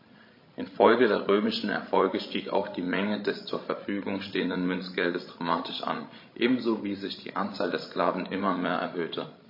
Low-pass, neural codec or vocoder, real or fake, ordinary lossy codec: 5.4 kHz; codec, 16 kHz, 16 kbps, FreqCodec, larger model; fake; MP3, 24 kbps